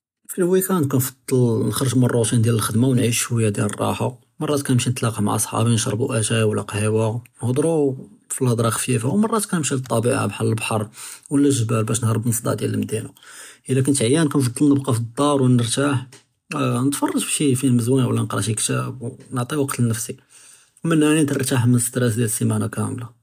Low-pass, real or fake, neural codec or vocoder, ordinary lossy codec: 14.4 kHz; real; none; AAC, 96 kbps